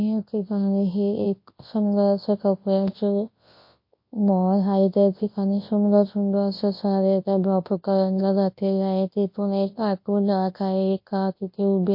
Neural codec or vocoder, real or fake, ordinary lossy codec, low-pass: codec, 24 kHz, 0.9 kbps, WavTokenizer, large speech release; fake; MP3, 32 kbps; 5.4 kHz